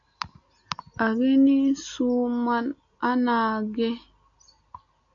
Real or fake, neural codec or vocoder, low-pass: real; none; 7.2 kHz